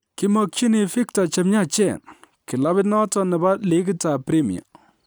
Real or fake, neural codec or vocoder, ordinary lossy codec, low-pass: real; none; none; none